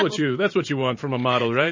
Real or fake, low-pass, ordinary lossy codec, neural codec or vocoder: fake; 7.2 kHz; MP3, 32 kbps; vocoder, 44.1 kHz, 128 mel bands every 512 samples, BigVGAN v2